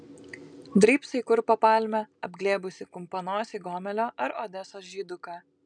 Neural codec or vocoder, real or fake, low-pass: none; real; 9.9 kHz